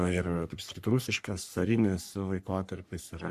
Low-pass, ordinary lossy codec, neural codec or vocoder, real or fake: 14.4 kHz; Opus, 64 kbps; codec, 44.1 kHz, 3.4 kbps, Pupu-Codec; fake